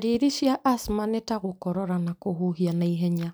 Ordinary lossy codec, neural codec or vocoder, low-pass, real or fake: none; none; none; real